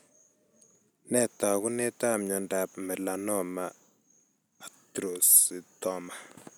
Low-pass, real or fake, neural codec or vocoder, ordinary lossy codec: none; real; none; none